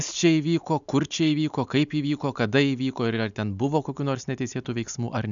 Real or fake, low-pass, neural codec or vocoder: real; 7.2 kHz; none